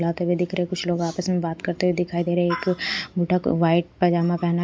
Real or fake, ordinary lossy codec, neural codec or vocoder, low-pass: real; none; none; none